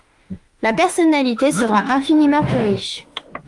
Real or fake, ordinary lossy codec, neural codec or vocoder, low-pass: fake; Opus, 32 kbps; autoencoder, 48 kHz, 32 numbers a frame, DAC-VAE, trained on Japanese speech; 10.8 kHz